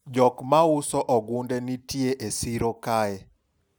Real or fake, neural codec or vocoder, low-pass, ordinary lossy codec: real; none; none; none